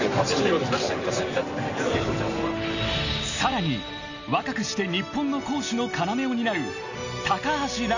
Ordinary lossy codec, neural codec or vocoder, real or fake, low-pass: none; none; real; 7.2 kHz